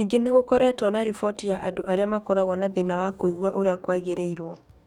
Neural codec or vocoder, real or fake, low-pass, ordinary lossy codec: codec, 44.1 kHz, 2.6 kbps, DAC; fake; 19.8 kHz; none